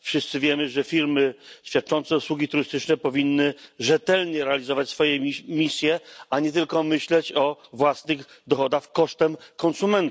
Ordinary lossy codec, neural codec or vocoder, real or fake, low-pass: none; none; real; none